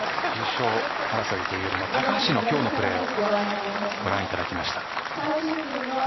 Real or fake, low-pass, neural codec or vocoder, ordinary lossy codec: fake; 7.2 kHz; vocoder, 44.1 kHz, 128 mel bands every 256 samples, BigVGAN v2; MP3, 24 kbps